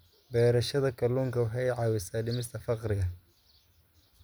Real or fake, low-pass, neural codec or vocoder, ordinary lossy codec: real; none; none; none